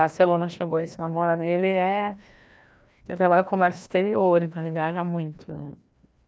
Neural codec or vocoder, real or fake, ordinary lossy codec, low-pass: codec, 16 kHz, 1 kbps, FreqCodec, larger model; fake; none; none